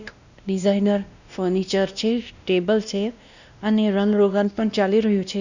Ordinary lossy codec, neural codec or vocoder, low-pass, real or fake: none; codec, 16 kHz, 1 kbps, X-Codec, WavLM features, trained on Multilingual LibriSpeech; 7.2 kHz; fake